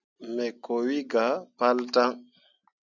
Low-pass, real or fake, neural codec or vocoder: 7.2 kHz; real; none